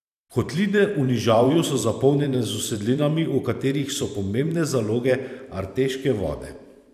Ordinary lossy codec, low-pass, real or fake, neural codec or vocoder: none; 14.4 kHz; fake; vocoder, 44.1 kHz, 128 mel bands every 512 samples, BigVGAN v2